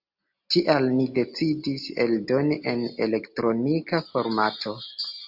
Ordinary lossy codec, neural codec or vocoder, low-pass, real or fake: AAC, 48 kbps; none; 5.4 kHz; real